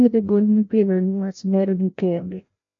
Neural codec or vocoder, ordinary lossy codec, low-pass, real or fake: codec, 16 kHz, 0.5 kbps, FreqCodec, larger model; MP3, 48 kbps; 7.2 kHz; fake